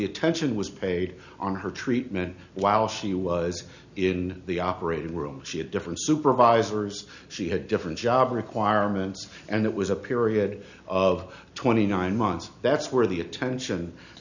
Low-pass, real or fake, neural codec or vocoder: 7.2 kHz; real; none